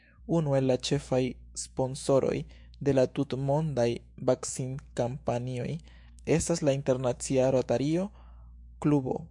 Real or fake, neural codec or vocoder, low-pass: fake; autoencoder, 48 kHz, 128 numbers a frame, DAC-VAE, trained on Japanese speech; 10.8 kHz